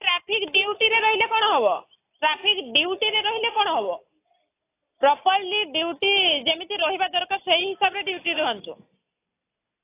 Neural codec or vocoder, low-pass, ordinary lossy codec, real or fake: none; 3.6 kHz; AAC, 24 kbps; real